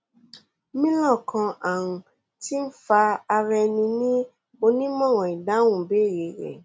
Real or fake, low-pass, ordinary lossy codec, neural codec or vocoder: real; none; none; none